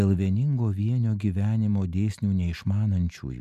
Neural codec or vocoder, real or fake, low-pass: none; real; 14.4 kHz